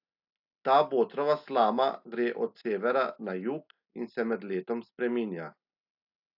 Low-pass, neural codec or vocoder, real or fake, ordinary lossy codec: 5.4 kHz; none; real; AAC, 48 kbps